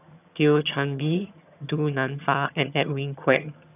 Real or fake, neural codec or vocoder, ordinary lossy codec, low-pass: fake; vocoder, 22.05 kHz, 80 mel bands, HiFi-GAN; none; 3.6 kHz